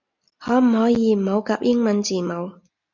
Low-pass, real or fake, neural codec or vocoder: 7.2 kHz; real; none